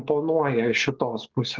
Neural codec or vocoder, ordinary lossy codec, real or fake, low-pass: none; Opus, 16 kbps; real; 7.2 kHz